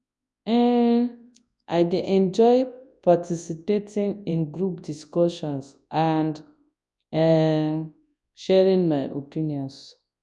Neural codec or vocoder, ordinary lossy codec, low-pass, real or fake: codec, 24 kHz, 0.9 kbps, WavTokenizer, large speech release; none; 10.8 kHz; fake